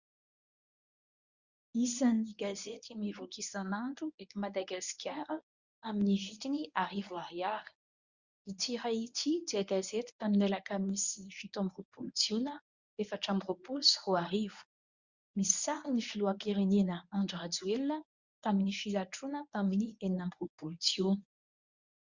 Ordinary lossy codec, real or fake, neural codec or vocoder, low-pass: Opus, 64 kbps; fake; codec, 24 kHz, 0.9 kbps, WavTokenizer, medium speech release version 1; 7.2 kHz